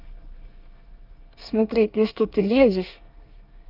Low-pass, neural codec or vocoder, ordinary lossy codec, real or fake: 5.4 kHz; codec, 24 kHz, 1 kbps, SNAC; Opus, 24 kbps; fake